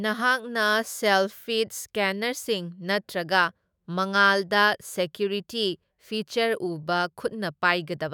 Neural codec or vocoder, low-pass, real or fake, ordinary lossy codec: autoencoder, 48 kHz, 128 numbers a frame, DAC-VAE, trained on Japanese speech; none; fake; none